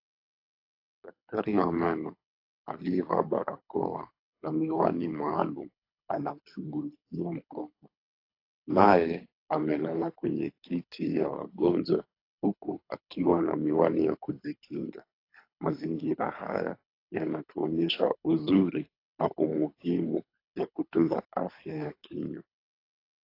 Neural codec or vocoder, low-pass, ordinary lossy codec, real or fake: codec, 24 kHz, 3 kbps, HILCodec; 5.4 kHz; AAC, 32 kbps; fake